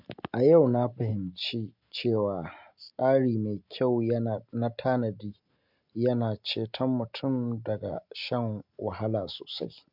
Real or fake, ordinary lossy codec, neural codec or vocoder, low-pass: real; MP3, 48 kbps; none; 5.4 kHz